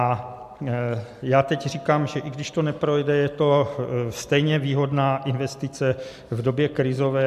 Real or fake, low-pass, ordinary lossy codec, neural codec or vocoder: real; 14.4 kHz; AAC, 96 kbps; none